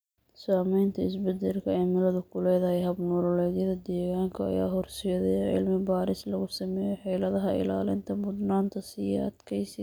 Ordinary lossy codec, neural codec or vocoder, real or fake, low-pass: none; none; real; none